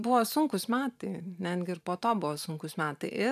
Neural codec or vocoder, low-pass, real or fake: vocoder, 44.1 kHz, 128 mel bands every 512 samples, BigVGAN v2; 14.4 kHz; fake